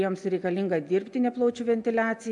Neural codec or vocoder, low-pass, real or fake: none; 10.8 kHz; real